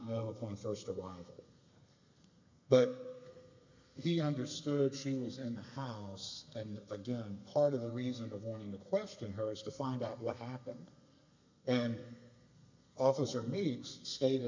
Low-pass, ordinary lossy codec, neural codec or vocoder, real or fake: 7.2 kHz; MP3, 64 kbps; codec, 32 kHz, 1.9 kbps, SNAC; fake